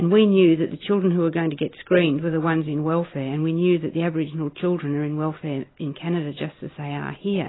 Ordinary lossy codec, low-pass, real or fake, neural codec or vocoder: AAC, 16 kbps; 7.2 kHz; real; none